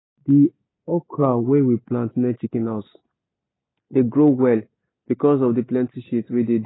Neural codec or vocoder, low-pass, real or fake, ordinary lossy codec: none; 7.2 kHz; real; AAC, 16 kbps